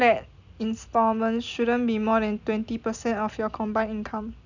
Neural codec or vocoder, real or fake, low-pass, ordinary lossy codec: none; real; 7.2 kHz; none